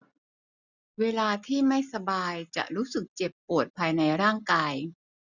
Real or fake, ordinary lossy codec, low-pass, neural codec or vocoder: real; none; 7.2 kHz; none